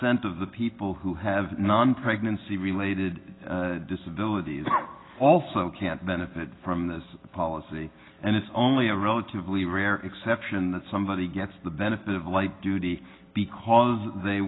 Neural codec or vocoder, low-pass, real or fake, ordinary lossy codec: none; 7.2 kHz; real; AAC, 16 kbps